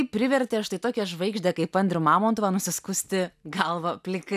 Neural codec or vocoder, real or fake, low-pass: none; real; 14.4 kHz